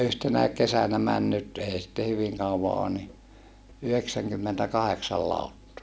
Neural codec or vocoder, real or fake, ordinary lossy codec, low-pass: none; real; none; none